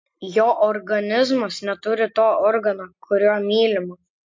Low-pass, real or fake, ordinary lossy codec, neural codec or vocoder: 7.2 kHz; real; MP3, 48 kbps; none